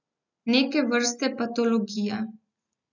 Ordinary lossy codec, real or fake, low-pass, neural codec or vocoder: none; real; 7.2 kHz; none